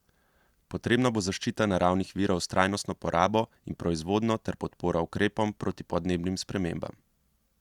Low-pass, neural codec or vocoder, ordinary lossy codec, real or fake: 19.8 kHz; none; Opus, 64 kbps; real